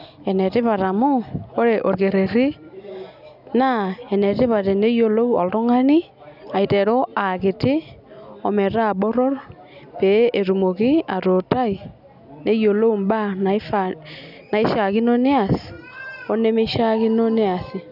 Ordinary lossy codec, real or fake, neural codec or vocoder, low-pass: none; real; none; 5.4 kHz